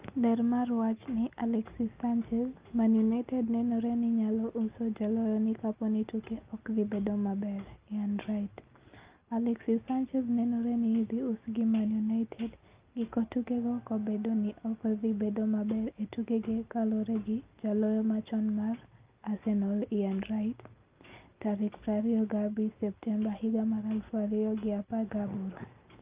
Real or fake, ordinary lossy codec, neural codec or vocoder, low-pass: real; Opus, 24 kbps; none; 3.6 kHz